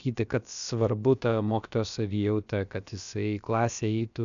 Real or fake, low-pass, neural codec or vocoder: fake; 7.2 kHz; codec, 16 kHz, about 1 kbps, DyCAST, with the encoder's durations